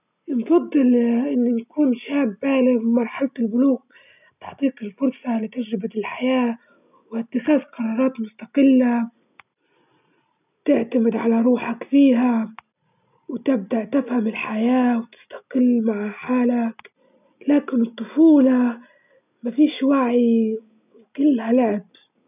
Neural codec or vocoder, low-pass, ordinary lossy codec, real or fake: none; 3.6 kHz; none; real